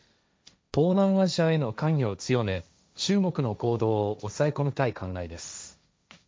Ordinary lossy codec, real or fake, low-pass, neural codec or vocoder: none; fake; none; codec, 16 kHz, 1.1 kbps, Voila-Tokenizer